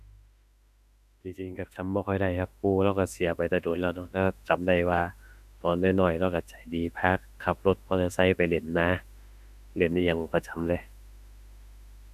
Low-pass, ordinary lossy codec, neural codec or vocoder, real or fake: 14.4 kHz; none; autoencoder, 48 kHz, 32 numbers a frame, DAC-VAE, trained on Japanese speech; fake